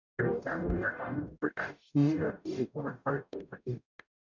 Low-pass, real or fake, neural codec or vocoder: 7.2 kHz; fake; codec, 44.1 kHz, 0.9 kbps, DAC